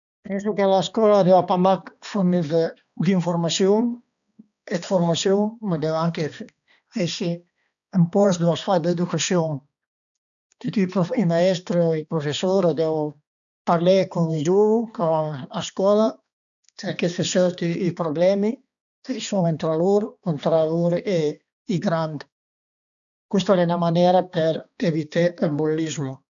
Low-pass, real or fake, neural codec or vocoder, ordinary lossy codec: 7.2 kHz; fake; codec, 16 kHz, 2 kbps, X-Codec, HuBERT features, trained on balanced general audio; none